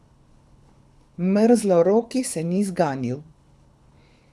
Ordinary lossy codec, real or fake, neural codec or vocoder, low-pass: none; fake; codec, 24 kHz, 6 kbps, HILCodec; none